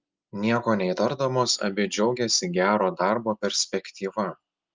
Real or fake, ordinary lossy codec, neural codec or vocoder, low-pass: real; Opus, 24 kbps; none; 7.2 kHz